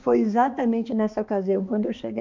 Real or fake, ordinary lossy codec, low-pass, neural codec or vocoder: fake; MP3, 48 kbps; 7.2 kHz; codec, 16 kHz, 2 kbps, X-Codec, HuBERT features, trained on balanced general audio